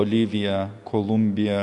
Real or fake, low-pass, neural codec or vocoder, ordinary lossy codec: real; 10.8 kHz; none; MP3, 64 kbps